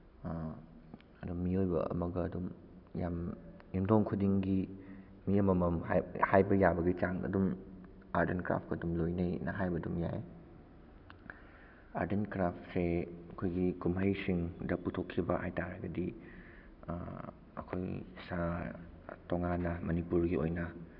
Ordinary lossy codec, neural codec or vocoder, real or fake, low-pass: none; none; real; 5.4 kHz